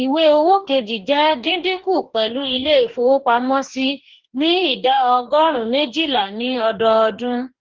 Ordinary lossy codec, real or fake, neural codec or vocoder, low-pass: Opus, 16 kbps; fake; codec, 44.1 kHz, 2.6 kbps, DAC; 7.2 kHz